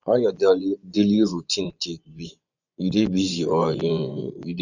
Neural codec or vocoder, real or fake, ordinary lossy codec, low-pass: vocoder, 24 kHz, 100 mel bands, Vocos; fake; none; 7.2 kHz